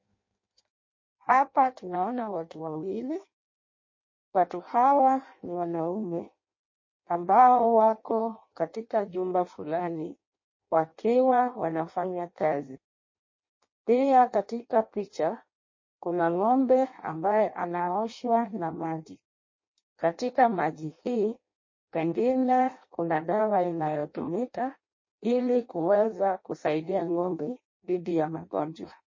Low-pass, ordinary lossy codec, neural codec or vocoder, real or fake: 7.2 kHz; MP3, 32 kbps; codec, 16 kHz in and 24 kHz out, 0.6 kbps, FireRedTTS-2 codec; fake